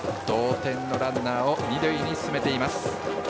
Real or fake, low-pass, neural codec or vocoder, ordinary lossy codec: real; none; none; none